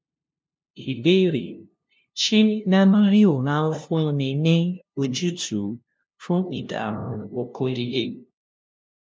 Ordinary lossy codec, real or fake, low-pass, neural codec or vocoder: none; fake; none; codec, 16 kHz, 0.5 kbps, FunCodec, trained on LibriTTS, 25 frames a second